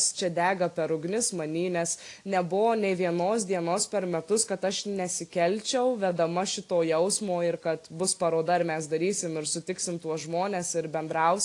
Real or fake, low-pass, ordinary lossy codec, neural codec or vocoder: real; 10.8 kHz; AAC, 48 kbps; none